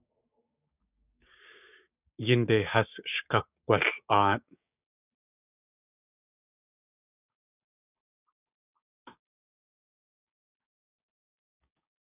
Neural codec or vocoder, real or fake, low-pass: codec, 16 kHz, 6 kbps, DAC; fake; 3.6 kHz